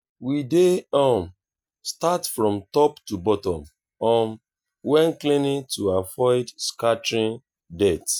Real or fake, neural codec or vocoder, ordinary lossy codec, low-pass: real; none; none; none